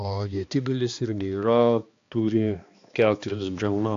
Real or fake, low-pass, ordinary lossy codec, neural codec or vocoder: fake; 7.2 kHz; AAC, 48 kbps; codec, 16 kHz, 2 kbps, X-Codec, HuBERT features, trained on balanced general audio